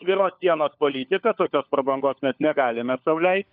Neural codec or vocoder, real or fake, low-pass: codec, 16 kHz, 4 kbps, FunCodec, trained on LibriTTS, 50 frames a second; fake; 5.4 kHz